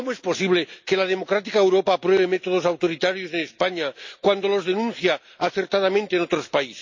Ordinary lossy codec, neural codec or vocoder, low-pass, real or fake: MP3, 48 kbps; none; 7.2 kHz; real